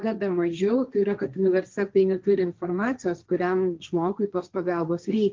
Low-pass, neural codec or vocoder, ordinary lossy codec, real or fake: 7.2 kHz; codec, 16 kHz, 1.1 kbps, Voila-Tokenizer; Opus, 32 kbps; fake